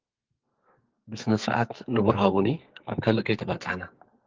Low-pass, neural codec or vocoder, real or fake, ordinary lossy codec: 7.2 kHz; codec, 44.1 kHz, 2.6 kbps, SNAC; fake; Opus, 24 kbps